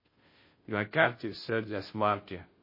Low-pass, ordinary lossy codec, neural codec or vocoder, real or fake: 5.4 kHz; MP3, 24 kbps; codec, 16 kHz, 0.5 kbps, FunCodec, trained on Chinese and English, 25 frames a second; fake